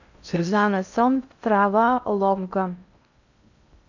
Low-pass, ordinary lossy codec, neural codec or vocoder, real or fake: 7.2 kHz; none; codec, 16 kHz in and 24 kHz out, 0.6 kbps, FocalCodec, streaming, 2048 codes; fake